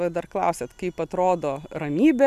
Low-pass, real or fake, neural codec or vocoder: 14.4 kHz; real; none